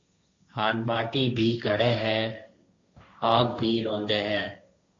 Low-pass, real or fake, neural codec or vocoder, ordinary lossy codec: 7.2 kHz; fake; codec, 16 kHz, 1.1 kbps, Voila-Tokenizer; AAC, 48 kbps